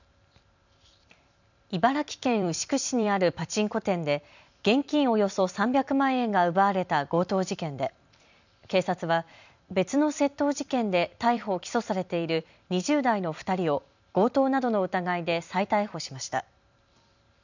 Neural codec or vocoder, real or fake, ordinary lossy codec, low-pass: vocoder, 44.1 kHz, 128 mel bands every 256 samples, BigVGAN v2; fake; none; 7.2 kHz